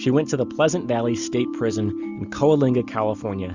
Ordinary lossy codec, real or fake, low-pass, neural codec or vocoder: Opus, 64 kbps; real; 7.2 kHz; none